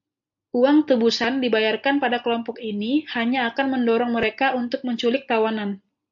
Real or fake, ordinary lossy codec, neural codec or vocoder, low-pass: real; AAC, 64 kbps; none; 7.2 kHz